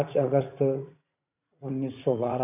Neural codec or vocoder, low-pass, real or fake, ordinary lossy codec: vocoder, 44.1 kHz, 80 mel bands, Vocos; 3.6 kHz; fake; none